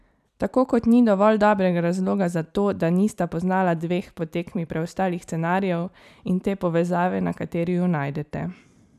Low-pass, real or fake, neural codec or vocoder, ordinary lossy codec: 14.4 kHz; fake; vocoder, 44.1 kHz, 128 mel bands every 256 samples, BigVGAN v2; none